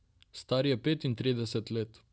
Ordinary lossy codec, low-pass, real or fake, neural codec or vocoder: none; none; real; none